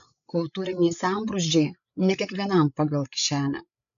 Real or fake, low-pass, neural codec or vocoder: fake; 7.2 kHz; codec, 16 kHz, 8 kbps, FreqCodec, larger model